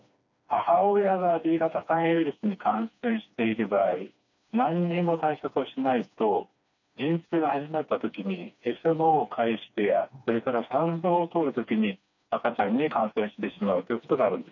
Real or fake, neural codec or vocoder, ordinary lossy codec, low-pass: fake; codec, 16 kHz, 2 kbps, FreqCodec, smaller model; none; 7.2 kHz